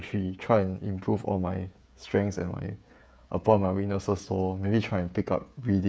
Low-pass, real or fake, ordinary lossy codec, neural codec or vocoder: none; fake; none; codec, 16 kHz, 16 kbps, FreqCodec, smaller model